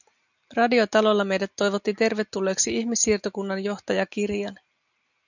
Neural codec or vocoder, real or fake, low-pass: none; real; 7.2 kHz